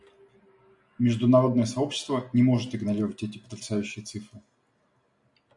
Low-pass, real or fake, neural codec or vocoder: 10.8 kHz; real; none